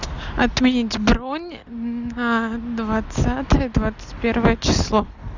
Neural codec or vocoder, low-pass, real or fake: none; 7.2 kHz; real